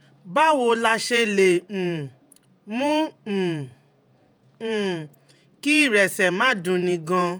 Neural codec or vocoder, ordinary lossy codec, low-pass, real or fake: vocoder, 48 kHz, 128 mel bands, Vocos; none; none; fake